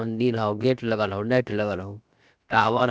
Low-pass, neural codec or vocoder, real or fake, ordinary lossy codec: none; codec, 16 kHz, about 1 kbps, DyCAST, with the encoder's durations; fake; none